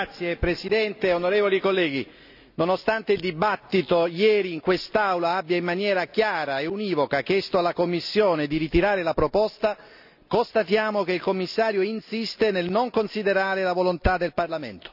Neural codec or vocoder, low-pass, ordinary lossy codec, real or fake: none; 5.4 kHz; none; real